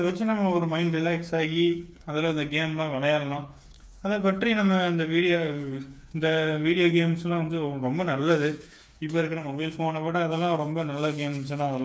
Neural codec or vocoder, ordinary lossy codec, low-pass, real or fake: codec, 16 kHz, 4 kbps, FreqCodec, smaller model; none; none; fake